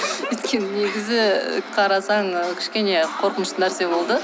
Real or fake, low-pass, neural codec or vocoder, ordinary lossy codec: real; none; none; none